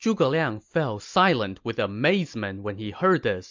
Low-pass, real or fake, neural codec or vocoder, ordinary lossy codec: 7.2 kHz; real; none; MP3, 64 kbps